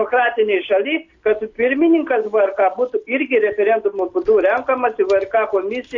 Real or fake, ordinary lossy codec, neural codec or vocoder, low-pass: real; MP3, 48 kbps; none; 7.2 kHz